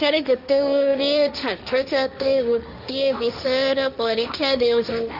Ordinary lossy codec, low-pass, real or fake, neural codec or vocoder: none; 5.4 kHz; fake; codec, 16 kHz, 1.1 kbps, Voila-Tokenizer